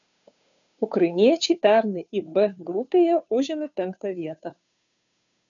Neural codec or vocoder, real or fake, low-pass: codec, 16 kHz, 2 kbps, FunCodec, trained on Chinese and English, 25 frames a second; fake; 7.2 kHz